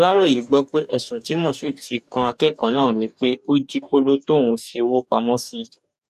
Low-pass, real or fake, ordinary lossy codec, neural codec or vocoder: 14.4 kHz; fake; none; codec, 44.1 kHz, 2.6 kbps, DAC